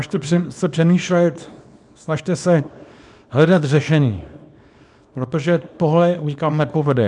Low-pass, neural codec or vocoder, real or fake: 10.8 kHz; codec, 24 kHz, 0.9 kbps, WavTokenizer, small release; fake